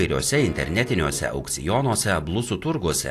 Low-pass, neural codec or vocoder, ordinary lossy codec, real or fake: 14.4 kHz; none; AAC, 64 kbps; real